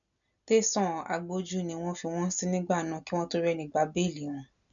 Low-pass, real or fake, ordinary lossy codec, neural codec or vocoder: 7.2 kHz; real; none; none